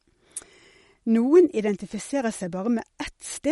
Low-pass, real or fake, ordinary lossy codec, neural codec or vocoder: 19.8 kHz; real; MP3, 48 kbps; none